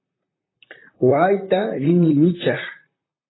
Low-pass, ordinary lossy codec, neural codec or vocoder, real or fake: 7.2 kHz; AAC, 16 kbps; vocoder, 44.1 kHz, 128 mel bands, Pupu-Vocoder; fake